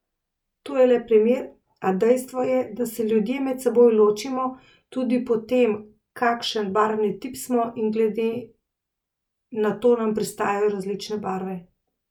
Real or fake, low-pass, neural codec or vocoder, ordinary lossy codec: real; 19.8 kHz; none; none